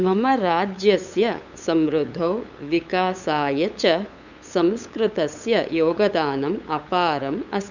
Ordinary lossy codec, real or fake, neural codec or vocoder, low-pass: none; fake; codec, 16 kHz, 8 kbps, FunCodec, trained on LibriTTS, 25 frames a second; 7.2 kHz